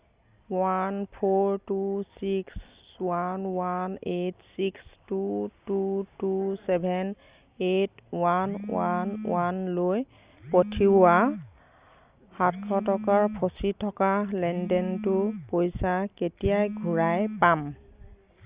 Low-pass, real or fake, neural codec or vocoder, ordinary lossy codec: 3.6 kHz; real; none; Opus, 64 kbps